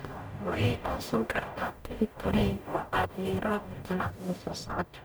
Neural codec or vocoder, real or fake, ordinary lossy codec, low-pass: codec, 44.1 kHz, 0.9 kbps, DAC; fake; none; none